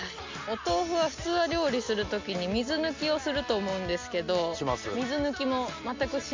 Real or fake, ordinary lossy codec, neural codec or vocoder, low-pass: real; MP3, 64 kbps; none; 7.2 kHz